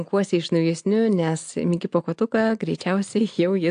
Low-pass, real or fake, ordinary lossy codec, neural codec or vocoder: 9.9 kHz; real; AAC, 64 kbps; none